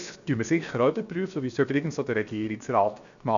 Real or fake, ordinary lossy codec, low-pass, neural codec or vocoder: fake; none; 7.2 kHz; codec, 16 kHz, 0.7 kbps, FocalCodec